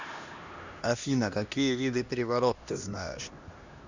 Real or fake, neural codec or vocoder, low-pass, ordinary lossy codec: fake; codec, 16 kHz, 1 kbps, X-Codec, HuBERT features, trained on LibriSpeech; 7.2 kHz; Opus, 64 kbps